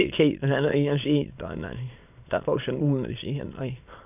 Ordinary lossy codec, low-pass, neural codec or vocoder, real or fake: AAC, 32 kbps; 3.6 kHz; autoencoder, 22.05 kHz, a latent of 192 numbers a frame, VITS, trained on many speakers; fake